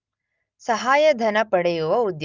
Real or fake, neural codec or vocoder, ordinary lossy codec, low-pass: real; none; Opus, 24 kbps; 7.2 kHz